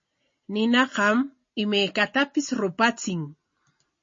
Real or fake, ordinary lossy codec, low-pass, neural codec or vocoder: real; MP3, 32 kbps; 7.2 kHz; none